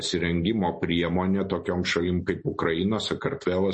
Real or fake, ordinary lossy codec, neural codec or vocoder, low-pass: real; MP3, 32 kbps; none; 10.8 kHz